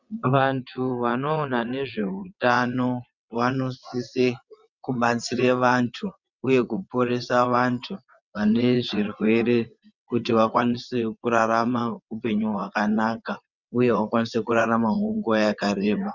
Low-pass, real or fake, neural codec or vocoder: 7.2 kHz; fake; vocoder, 22.05 kHz, 80 mel bands, WaveNeXt